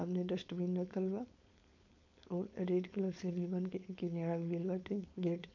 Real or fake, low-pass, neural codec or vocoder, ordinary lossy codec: fake; 7.2 kHz; codec, 16 kHz, 4.8 kbps, FACodec; none